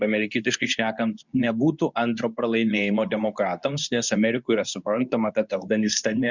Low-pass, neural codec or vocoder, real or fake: 7.2 kHz; codec, 24 kHz, 0.9 kbps, WavTokenizer, medium speech release version 2; fake